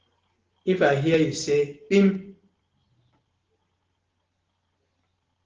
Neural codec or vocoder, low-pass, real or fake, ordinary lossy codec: none; 7.2 kHz; real; Opus, 16 kbps